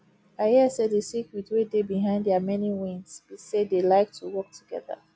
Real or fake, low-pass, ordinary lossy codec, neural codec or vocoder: real; none; none; none